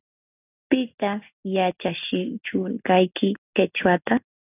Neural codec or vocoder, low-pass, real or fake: none; 3.6 kHz; real